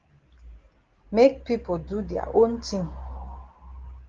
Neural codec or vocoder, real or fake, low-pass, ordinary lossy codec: none; real; 7.2 kHz; Opus, 16 kbps